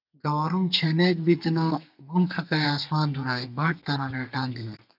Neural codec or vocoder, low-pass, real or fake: codec, 32 kHz, 1.9 kbps, SNAC; 5.4 kHz; fake